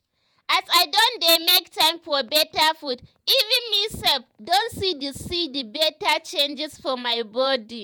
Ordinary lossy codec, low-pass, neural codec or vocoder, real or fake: none; none; vocoder, 48 kHz, 128 mel bands, Vocos; fake